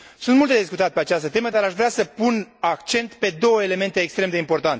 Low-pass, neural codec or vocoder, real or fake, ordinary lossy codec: none; none; real; none